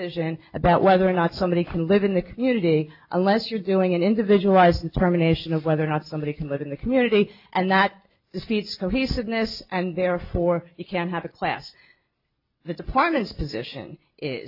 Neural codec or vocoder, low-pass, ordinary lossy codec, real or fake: none; 5.4 kHz; AAC, 48 kbps; real